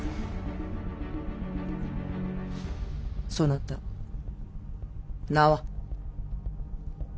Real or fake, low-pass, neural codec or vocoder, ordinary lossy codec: real; none; none; none